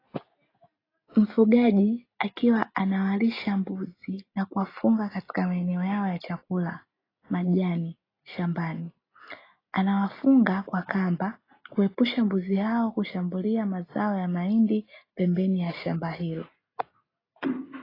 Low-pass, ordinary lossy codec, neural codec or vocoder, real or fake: 5.4 kHz; AAC, 24 kbps; none; real